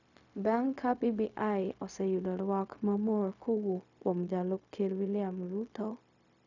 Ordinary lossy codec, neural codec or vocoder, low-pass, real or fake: none; codec, 16 kHz, 0.4 kbps, LongCat-Audio-Codec; 7.2 kHz; fake